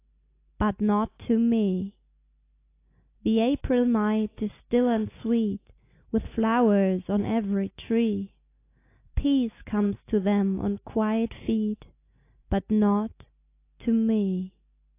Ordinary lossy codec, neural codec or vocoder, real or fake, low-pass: AAC, 24 kbps; none; real; 3.6 kHz